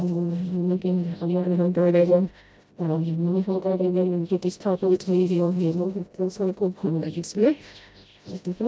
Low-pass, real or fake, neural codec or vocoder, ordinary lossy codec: none; fake; codec, 16 kHz, 0.5 kbps, FreqCodec, smaller model; none